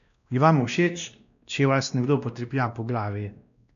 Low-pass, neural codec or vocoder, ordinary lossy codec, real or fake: 7.2 kHz; codec, 16 kHz, 1 kbps, X-Codec, WavLM features, trained on Multilingual LibriSpeech; none; fake